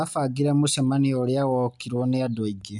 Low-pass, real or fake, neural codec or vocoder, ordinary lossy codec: 10.8 kHz; real; none; none